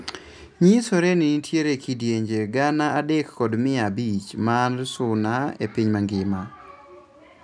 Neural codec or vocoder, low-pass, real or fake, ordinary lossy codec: none; 9.9 kHz; real; none